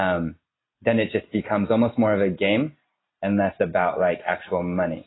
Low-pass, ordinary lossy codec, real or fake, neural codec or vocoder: 7.2 kHz; AAC, 16 kbps; real; none